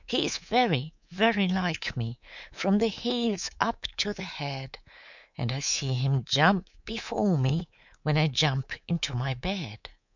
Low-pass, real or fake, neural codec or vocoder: 7.2 kHz; fake; codec, 24 kHz, 3.1 kbps, DualCodec